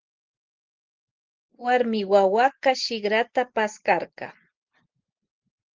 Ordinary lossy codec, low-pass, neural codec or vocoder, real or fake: Opus, 16 kbps; 7.2 kHz; none; real